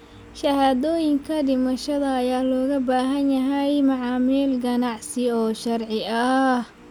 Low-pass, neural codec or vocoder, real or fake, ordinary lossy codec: 19.8 kHz; none; real; none